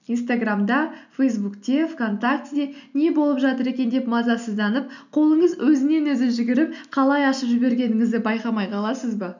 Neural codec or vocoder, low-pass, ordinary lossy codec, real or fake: none; 7.2 kHz; none; real